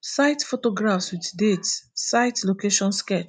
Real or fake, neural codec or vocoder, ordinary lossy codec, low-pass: real; none; none; 9.9 kHz